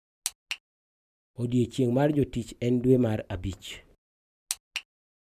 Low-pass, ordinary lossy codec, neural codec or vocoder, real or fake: 14.4 kHz; none; none; real